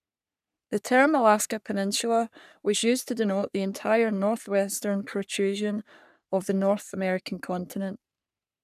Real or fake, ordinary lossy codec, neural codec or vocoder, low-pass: fake; none; codec, 44.1 kHz, 3.4 kbps, Pupu-Codec; 14.4 kHz